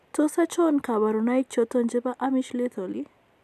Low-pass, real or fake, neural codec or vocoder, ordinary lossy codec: 14.4 kHz; real; none; none